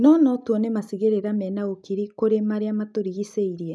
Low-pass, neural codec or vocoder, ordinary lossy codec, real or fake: none; none; none; real